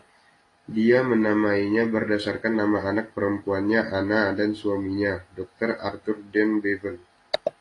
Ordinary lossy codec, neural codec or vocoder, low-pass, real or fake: AAC, 32 kbps; none; 10.8 kHz; real